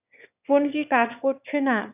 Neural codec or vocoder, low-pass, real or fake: autoencoder, 22.05 kHz, a latent of 192 numbers a frame, VITS, trained on one speaker; 3.6 kHz; fake